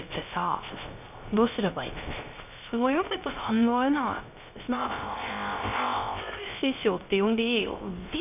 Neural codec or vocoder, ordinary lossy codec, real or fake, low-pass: codec, 16 kHz, 0.3 kbps, FocalCodec; none; fake; 3.6 kHz